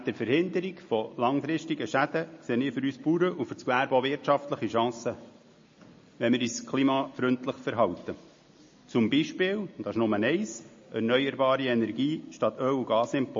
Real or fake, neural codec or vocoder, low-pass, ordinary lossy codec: real; none; 7.2 kHz; MP3, 32 kbps